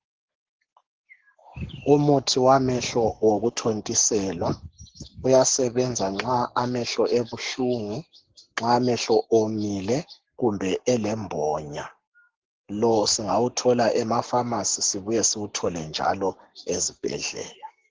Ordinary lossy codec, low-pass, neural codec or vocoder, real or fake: Opus, 16 kbps; 7.2 kHz; codec, 44.1 kHz, 7.8 kbps, Pupu-Codec; fake